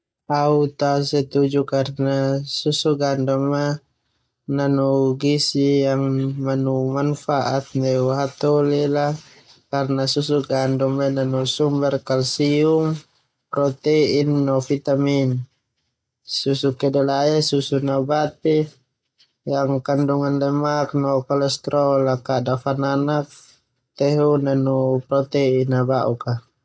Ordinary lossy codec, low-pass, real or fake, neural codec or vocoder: none; none; real; none